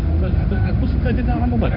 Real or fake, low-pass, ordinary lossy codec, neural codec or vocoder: fake; 5.4 kHz; none; codec, 16 kHz in and 24 kHz out, 1 kbps, XY-Tokenizer